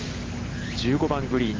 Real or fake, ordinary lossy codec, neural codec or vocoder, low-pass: real; Opus, 16 kbps; none; 7.2 kHz